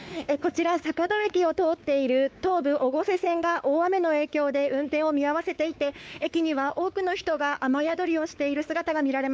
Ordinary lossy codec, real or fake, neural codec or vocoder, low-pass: none; fake; codec, 16 kHz, 4 kbps, X-Codec, WavLM features, trained on Multilingual LibriSpeech; none